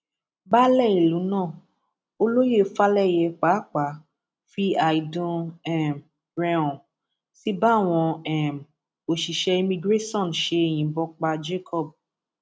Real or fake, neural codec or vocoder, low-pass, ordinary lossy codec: real; none; none; none